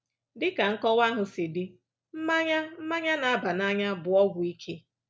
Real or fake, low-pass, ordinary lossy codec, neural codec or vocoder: real; none; none; none